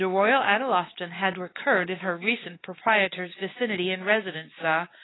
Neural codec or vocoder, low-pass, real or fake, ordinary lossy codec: codec, 16 kHz, 4 kbps, X-Codec, WavLM features, trained on Multilingual LibriSpeech; 7.2 kHz; fake; AAC, 16 kbps